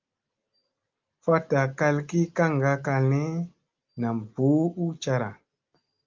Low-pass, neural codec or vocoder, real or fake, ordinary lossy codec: 7.2 kHz; none; real; Opus, 24 kbps